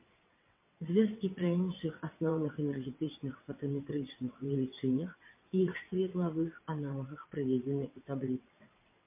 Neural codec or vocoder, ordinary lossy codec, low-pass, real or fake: vocoder, 22.05 kHz, 80 mel bands, WaveNeXt; MP3, 24 kbps; 3.6 kHz; fake